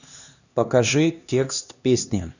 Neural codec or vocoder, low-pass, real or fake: codec, 16 kHz, 2 kbps, X-Codec, HuBERT features, trained on LibriSpeech; 7.2 kHz; fake